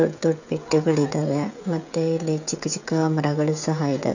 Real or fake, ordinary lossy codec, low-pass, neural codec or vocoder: fake; none; 7.2 kHz; codec, 16 kHz, 16 kbps, FreqCodec, smaller model